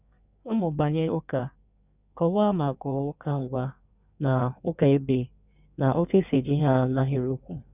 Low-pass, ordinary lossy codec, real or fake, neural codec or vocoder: 3.6 kHz; none; fake; codec, 16 kHz in and 24 kHz out, 1.1 kbps, FireRedTTS-2 codec